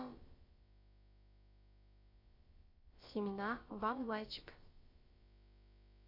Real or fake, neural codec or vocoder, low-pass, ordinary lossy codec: fake; codec, 16 kHz, about 1 kbps, DyCAST, with the encoder's durations; 5.4 kHz; MP3, 32 kbps